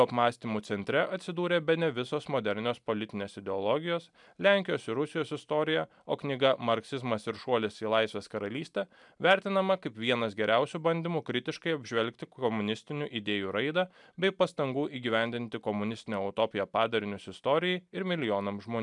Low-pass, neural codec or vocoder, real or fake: 10.8 kHz; none; real